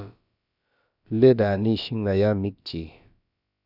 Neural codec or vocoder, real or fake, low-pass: codec, 16 kHz, about 1 kbps, DyCAST, with the encoder's durations; fake; 5.4 kHz